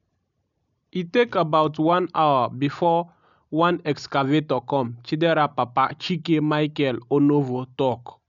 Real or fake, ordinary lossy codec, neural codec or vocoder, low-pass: real; none; none; 7.2 kHz